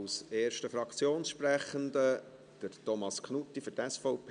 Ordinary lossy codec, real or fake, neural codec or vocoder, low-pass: none; real; none; 9.9 kHz